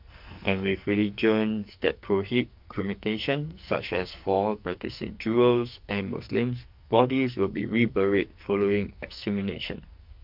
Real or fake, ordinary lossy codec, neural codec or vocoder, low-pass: fake; MP3, 48 kbps; codec, 44.1 kHz, 2.6 kbps, SNAC; 5.4 kHz